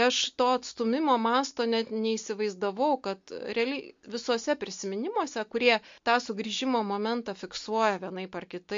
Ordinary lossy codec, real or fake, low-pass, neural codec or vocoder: MP3, 48 kbps; real; 7.2 kHz; none